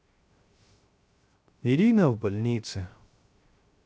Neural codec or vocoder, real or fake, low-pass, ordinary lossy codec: codec, 16 kHz, 0.3 kbps, FocalCodec; fake; none; none